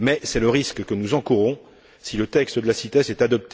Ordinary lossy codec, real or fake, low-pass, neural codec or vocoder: none; real; none; none